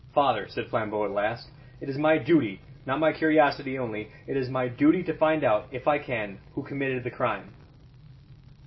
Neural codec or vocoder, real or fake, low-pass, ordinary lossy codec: none; real; 7.2 kHz; MP3, 24 kbps